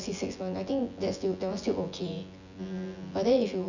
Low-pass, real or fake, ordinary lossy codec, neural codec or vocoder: 7.2 kHz; fake; none; vocoder, 24 kHz, 100 mel bands, Vocos